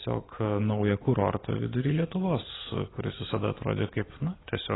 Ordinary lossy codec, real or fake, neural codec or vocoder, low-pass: AAC, 16 kbps; real; none; 7.2 kHz